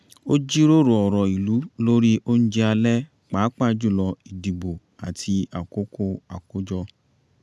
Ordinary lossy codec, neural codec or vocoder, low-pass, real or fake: none; none; none; real